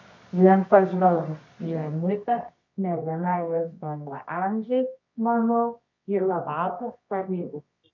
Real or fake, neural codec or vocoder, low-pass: fake; codec, 24 kHz, 0.9 kbps, WavTokenizer, medium music audio release; 7.2 kHz